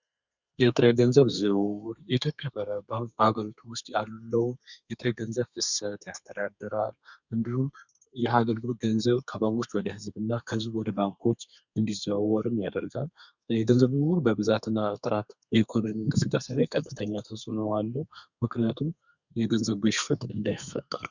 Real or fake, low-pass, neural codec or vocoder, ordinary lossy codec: fake; 7.2 kHz; codec, 32 kHz, 1.9 kbps, SNAC; Opus, 64 kbps